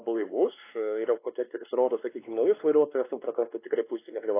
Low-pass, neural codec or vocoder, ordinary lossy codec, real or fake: 3.6 kHz; codec, 16 kHz, 2 kbps, X-Codec, WavLM features, trained on Multilingual LibriSpeech; AAC, 24 kbps; fake